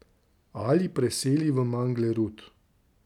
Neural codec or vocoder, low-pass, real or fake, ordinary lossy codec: none; 19.8 kHz; real; none